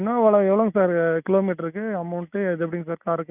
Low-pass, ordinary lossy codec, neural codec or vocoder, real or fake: 3.6 kHz; none; none; real